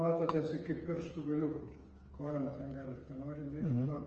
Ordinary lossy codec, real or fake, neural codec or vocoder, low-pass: Opus, 24 kbps; fake; codec, 16 kHz, 4 kbps, FreqCodec, smaller model; 7.2 kHz